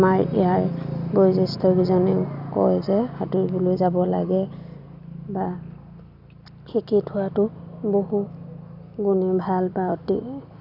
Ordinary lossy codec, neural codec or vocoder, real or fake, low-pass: none; none; real; 5.4 kHz